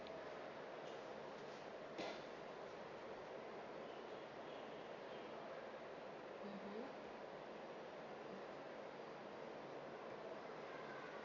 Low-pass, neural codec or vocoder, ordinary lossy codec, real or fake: 7.2 kHz; none; MP3, 48 kbps; real